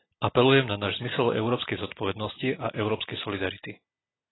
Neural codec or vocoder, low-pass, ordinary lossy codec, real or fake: none; 7.2 kHz; AAC, 16 kbps; real